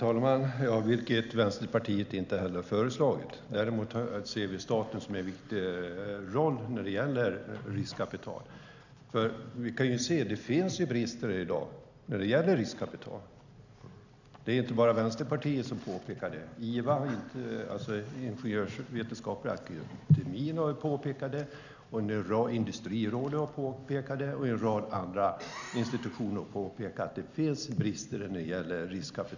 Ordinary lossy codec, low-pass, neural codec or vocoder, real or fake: none; 7.2 kHz; none; real